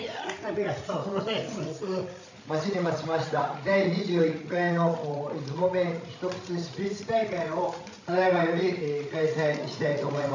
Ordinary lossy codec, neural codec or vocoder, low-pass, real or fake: AAC, 48 kbps; codec, 16 kHz, 16 kbps, FreqCodec, larger model; 7.2 kHz; fake